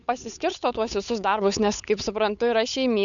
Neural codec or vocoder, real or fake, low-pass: codec, 16 kHz, 8 kbps, FunCodec, trained on Chinese and English, 25 frames a second; fake; 7.2 kHz